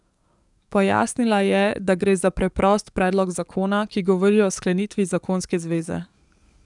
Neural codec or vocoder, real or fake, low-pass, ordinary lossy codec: codec, 44.1 kHz, 7.8 kbps, DAC; fake; 10.8 kHz; none